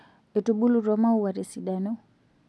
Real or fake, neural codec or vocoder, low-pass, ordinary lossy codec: real; none; none; none